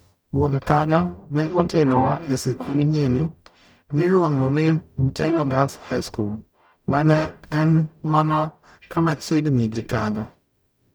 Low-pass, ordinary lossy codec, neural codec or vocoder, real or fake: none; none; codec, 44.1 kHz, 0.9 kbps, DAC; fake